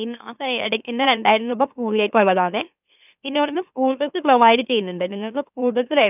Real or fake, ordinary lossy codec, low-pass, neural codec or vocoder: fake; none; 3.6 kHz; autoencoder, 44.1 kHz, a latent of 192 numbers a frame, MeloTTS